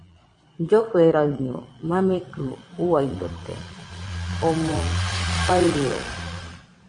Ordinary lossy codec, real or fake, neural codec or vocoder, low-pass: MP3, 48 kbps; fake; vocoder, 22.05 kHz, 80 mel bands, Vocos; 9.9 kHz